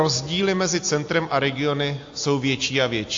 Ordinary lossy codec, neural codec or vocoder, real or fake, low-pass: AAC, 48 kbps; none; real; 7.2 kHz